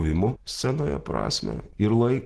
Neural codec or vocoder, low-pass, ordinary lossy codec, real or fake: none; 10.8 kHz; Opus, 16 kbps; real